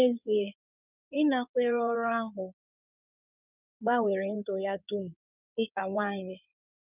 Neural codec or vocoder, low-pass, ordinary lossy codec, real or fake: codec, 16 kHz in and 24 kHz out, 2.2 kbps, FireRedTTS-2 codec; 3.6 kHz; none; fake